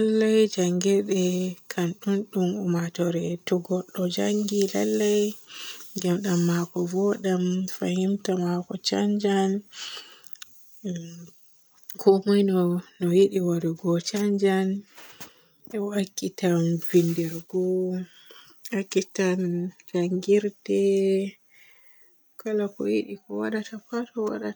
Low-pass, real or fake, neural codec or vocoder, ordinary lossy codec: none; real; none; none